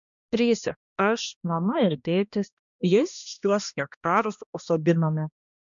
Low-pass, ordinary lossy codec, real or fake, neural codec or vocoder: 7.2 kHz; MP3, 96 kbps; fake; codec, 16 kHz, 1 kbps, X-Codec, HuBERT features, trained on balanced general audio